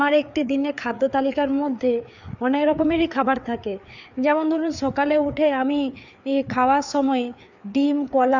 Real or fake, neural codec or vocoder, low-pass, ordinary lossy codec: fake; codec, 16 kHz, 4 kbps, FreqCodec, larger model; 7.2 kHz; none